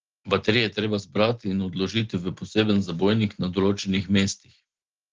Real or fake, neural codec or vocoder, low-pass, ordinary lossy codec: real; none; 7.2 kHz; Opus, 16 kbps